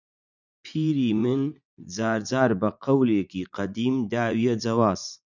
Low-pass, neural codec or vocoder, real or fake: 7.2 kHz; vocoder, 44.1 kHz, 80 mel bands, Vocos; fake